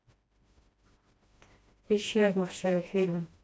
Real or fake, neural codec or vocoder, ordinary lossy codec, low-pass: fake; codec, 16 kHz, 1 kbps, FreqCodec, smaller model; none; none